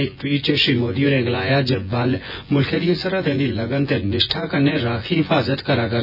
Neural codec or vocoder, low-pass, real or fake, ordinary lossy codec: vocoder, 24 kHz, 100 mel bands, Vocos; 5.4 kHz; fake; none